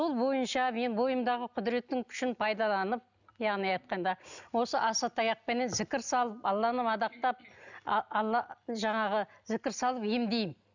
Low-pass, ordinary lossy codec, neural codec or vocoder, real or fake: 7.2 kHz; none; none; real